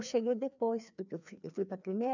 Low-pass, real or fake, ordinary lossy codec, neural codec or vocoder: 7.2 kHz; fake; none; codec, 16 kHz, 2 kbps, FreqCodec, larger model